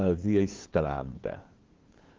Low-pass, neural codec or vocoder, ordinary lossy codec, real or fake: 7.2 kHz; codec, 16 kHz, 2 kbps, FunCodec, trained on LibriTTS, 25 frames a second; Opus, 16 kbps; fake